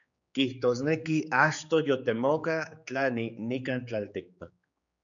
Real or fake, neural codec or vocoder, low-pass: fake; codec, 16 kHz, 4 kbps, X-Codec, HuBERT features, trained on general audio; 7.2 kHz